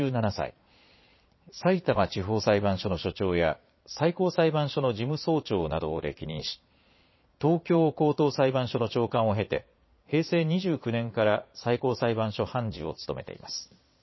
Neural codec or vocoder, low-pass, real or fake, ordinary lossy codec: vocoder, 22.05 kHz, 80 mel bands, Vocos; 7.2 kHz; fake; MP3, 24 kbps